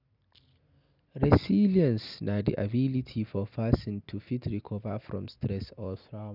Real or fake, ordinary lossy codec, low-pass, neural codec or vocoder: real; none; 5.4 kHz; none